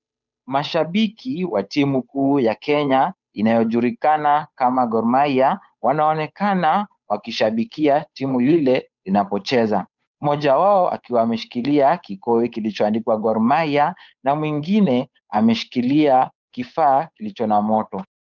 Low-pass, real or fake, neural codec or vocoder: 7.2 kHz; fake; codec, 16 kHz, 8 kbps, FunCodec, trained on Chinese and English, 25 frames a second